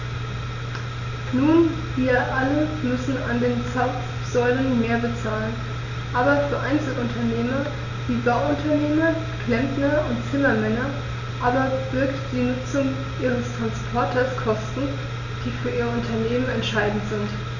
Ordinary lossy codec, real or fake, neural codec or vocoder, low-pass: none; real; none; 7.2 kHz